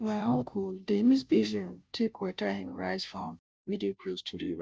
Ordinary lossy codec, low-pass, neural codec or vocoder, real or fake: none; none; codec, 16 kHz, 0.5 kbps, FunCodec, trained on Chinese and English, 25 frames a second; fake